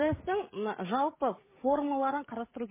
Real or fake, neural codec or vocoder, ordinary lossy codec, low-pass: fake; codec, 16 kHz, 16 kbps, FreqCodec, smaller model; MP3, 16 kbps; 3.6 kHz